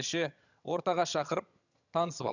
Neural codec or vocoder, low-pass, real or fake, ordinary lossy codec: vocoder, 22.05 kHz, 80 mel bands, HiFi-GAN; 7.2 kHz; fake; none